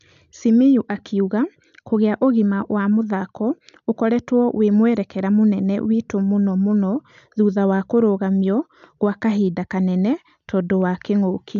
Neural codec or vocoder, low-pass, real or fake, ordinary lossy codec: none; 7.2 kHz; real; none